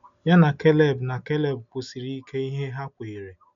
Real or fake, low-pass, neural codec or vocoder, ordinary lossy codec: real; 7.2 kHz; none; none